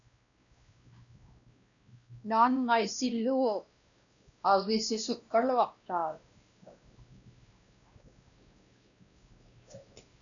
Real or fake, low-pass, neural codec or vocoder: fake; 7.2 kHz; codec, 16 kHz, 1 kbps, X-Codec, WavLM features, trained on Multilingual LibriSpeech